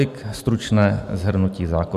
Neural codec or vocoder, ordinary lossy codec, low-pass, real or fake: none; MP3, 96 kbps; 14.4 kHz; real